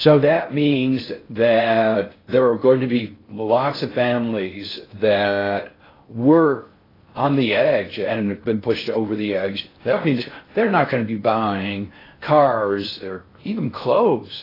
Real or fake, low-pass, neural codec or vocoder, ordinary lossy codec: fake; 5.4 kHz; codec, 16 kHz in and 24 kHz out, 0.6 kbps, FocalCodec, streaming, 4096 codes; AAC, 24 kbps